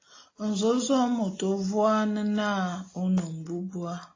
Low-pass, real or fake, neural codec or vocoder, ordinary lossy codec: 7.2 kHz; real; none; AAC, 32 kbps